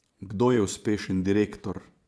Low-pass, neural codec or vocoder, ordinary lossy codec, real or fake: none; vocoder, 22.05 kHz, 80 mel bands, Vocos; none; fake